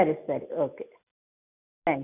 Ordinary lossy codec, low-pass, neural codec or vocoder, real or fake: none; 3.6 kHz; none; real